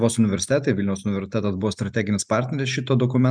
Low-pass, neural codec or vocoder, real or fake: 9.9 kHz; none; real